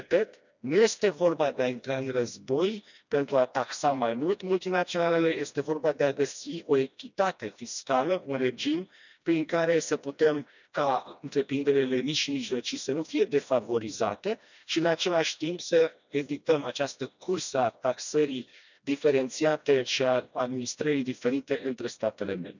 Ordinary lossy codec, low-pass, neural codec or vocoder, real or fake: none; 7.2 kHz; codec, 16 kHz, 1 kbps, FreqCodec, smaller model; fake